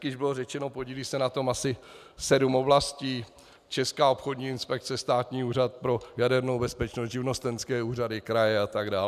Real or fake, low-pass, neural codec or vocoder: real; 14.4 kHz; none